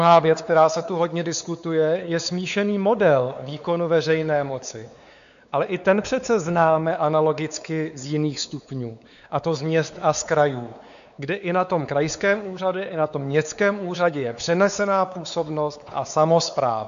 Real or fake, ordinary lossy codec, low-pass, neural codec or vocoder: fake; AAC, 96 kbps; 7.2 kHz; codec, 16 kHz, 4 kbps, X-Codec, WavLM features, trained on Multilingual LibriSpeech